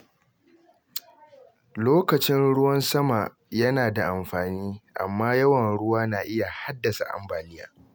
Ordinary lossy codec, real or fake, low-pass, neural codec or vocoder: none; real; none; none